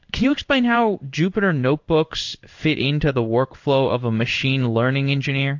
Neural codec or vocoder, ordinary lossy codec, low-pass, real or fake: codec, 16 kHz in and 24 kHz out, 1 kbps, XY-Tokenizer; AAC, 48 kbps; 7.2 kHz; fake